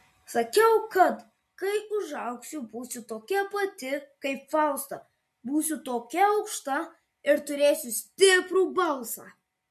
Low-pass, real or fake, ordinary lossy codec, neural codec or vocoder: 14.4 kHz; real; MP3, 64 kbps; none